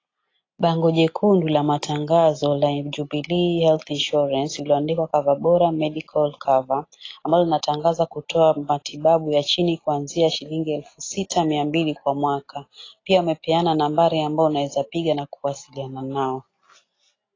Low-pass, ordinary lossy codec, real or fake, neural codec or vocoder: 7.2 kHz; AAC, 32 kbps; real; none